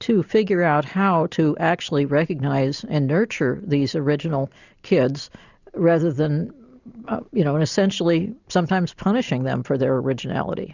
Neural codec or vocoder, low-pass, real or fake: none; 7.2 kHz; real